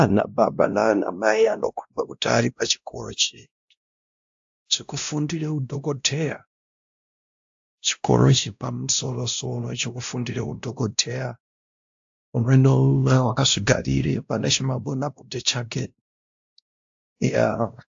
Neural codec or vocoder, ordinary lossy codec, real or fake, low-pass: codec, 16 kHz, 1 kbps, X-Codec, WavLM features, trained on Multilingual LibriSpeech; AAC, 64 kbps; fake; 7.2 kHz